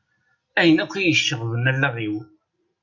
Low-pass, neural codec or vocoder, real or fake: 7.2 kHz; none; real